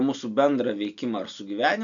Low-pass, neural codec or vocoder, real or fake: 7.2 kHz; none; real